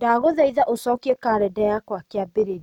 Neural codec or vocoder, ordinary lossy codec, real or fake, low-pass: none; none; real; 19.8 kHz